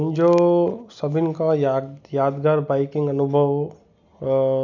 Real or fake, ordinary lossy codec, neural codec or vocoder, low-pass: real; none; none; 7.2 kHz